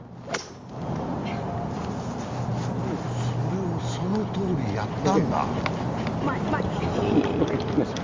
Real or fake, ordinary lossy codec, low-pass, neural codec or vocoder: real; Opus, 32 kbps; 7.2 kHz; none